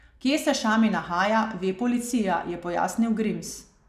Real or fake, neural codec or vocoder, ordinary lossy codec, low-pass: real; none; none; 14.4 kHz